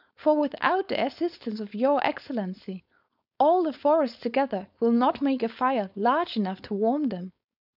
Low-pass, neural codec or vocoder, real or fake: 5.4 kHz; codec, 16 kHz, 4.8 kbps, FACodec; fake